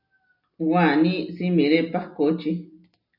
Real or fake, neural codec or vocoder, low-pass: real; none; 5.4 kHz